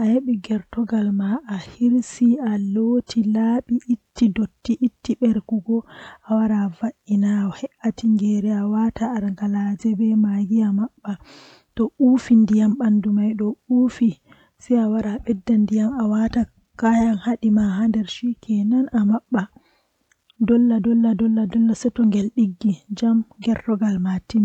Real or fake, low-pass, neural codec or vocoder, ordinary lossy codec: real; 19.8 kHz; none; none